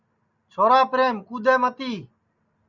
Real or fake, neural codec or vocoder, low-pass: real; none; 7.2 kHz